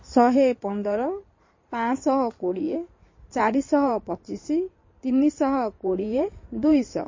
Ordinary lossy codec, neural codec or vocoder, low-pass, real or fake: MP3, 32 kbps; codec, 16 kHz in and 24 kHz out, 2.2 kbps, FireRedTTS-2 codec; 7.2 kHz; fake